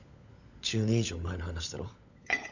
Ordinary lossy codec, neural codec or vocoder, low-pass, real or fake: none; codec, 16 kHz, 16 kbps, FunCodec, trained on LibriTTS, 50 frames a second; 7.2 kHz; fake